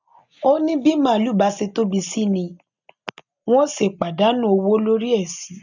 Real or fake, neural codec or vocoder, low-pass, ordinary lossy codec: real; none; 7.2 kHz; none